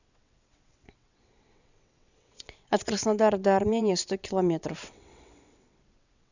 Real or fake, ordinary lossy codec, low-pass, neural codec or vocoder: fake; none; 7.2 kHz; vocoder, 22.05 kHz, 80 mel bands, WaveNeXt